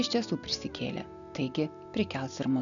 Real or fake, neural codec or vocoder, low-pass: real; none; 7.2 kHz